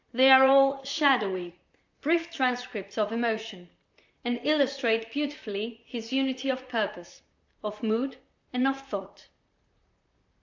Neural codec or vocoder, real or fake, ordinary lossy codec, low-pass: vocoder, 44.1 kHz, 128 mel bands, Pupu-Vocoder; fake; MP3, 64 kbps; 7.2 kHz